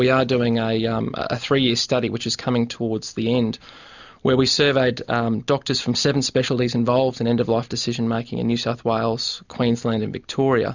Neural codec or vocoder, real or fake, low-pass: none; real; 7.2 kHz